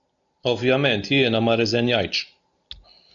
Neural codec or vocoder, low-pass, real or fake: none; 7.2 kHz; real